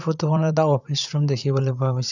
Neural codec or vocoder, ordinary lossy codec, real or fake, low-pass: vocoder, 44.1 kHz, 80 mel bands, Vocos; none; fake; 7.2 kHz